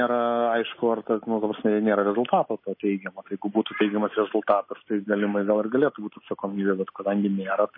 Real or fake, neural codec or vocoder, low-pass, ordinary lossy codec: real; none; 5.4 kHz; MP3, 24 kbps